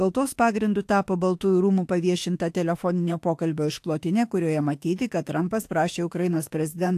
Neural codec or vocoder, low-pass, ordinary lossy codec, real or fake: autoencoder, 48 kHz, 32 numbers a frame, DAC-VAE, trained on Japanese speech; 14.4 kHz; AAC, 64 kbps; fake